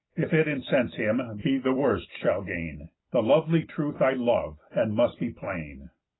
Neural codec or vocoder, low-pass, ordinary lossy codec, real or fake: none; 7.2 kHz; AAC, 16 kbps; real